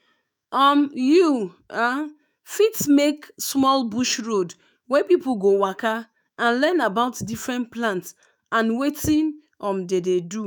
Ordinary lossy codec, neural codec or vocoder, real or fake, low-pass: none; autoencoder, 48 kHz, 128 numbers a frame, DAC-VAE, trained on Japanese speech; fake; none